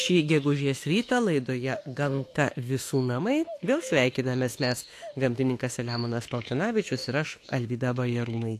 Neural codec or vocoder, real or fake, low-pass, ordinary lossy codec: autoencoder, 48 kHz, 32 numbers a frame, DAC-VAE, trained on Japanese speech; fake; 14.4 kHz; AAC, 64 kbps